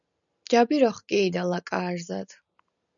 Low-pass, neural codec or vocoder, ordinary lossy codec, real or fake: 7.2 kHz; none; MP3, 96 kbps; real